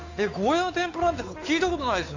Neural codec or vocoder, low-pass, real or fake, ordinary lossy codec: codec, 16 kHz, 8 kbps, FunCodec, trained on Chinese and English, 25 frames a second; 7.2 kHz; fake; AAC, 48 kbps